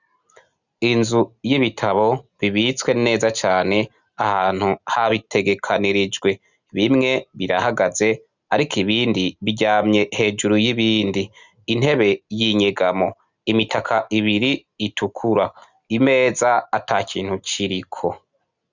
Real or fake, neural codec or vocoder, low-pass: real; none; 7.2 kHz